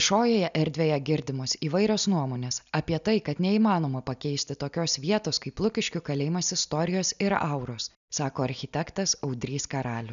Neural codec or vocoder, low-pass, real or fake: none; 7.2 kHz; real